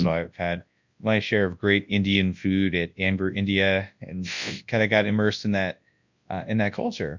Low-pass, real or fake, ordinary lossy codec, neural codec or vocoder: 7.2 kHz; fake; MP3, 64 kbps; codec, 24 kHz, 0.9 kbps, WavTokenizer, large speech release